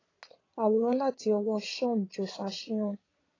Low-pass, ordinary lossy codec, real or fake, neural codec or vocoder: 7.2 kHz; AAC, 32 kbps; fake; vocoder, 22.05 kHz, 80 mel bands, Vocos